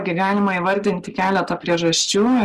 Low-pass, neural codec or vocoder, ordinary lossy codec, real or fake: 14.4 kHz; none; Opus, 24 kbps; real